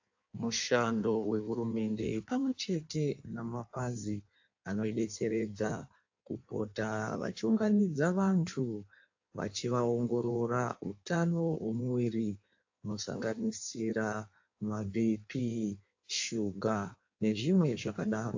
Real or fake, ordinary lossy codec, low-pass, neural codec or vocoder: fake; AAC, 48 kbps; 7.2 kHz; codec, 16 kHz in and 24 kHz out, 1.1 kbps, FireRedTTS-2 codec